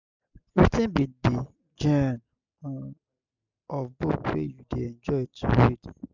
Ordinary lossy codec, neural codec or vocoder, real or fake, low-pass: none; none; real; 7.2 kHz